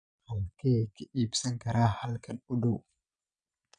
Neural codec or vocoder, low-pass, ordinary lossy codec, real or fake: vocoder, 22.05 kHz, 80 mel bands, Vocos; 9.9 kHz; none; fake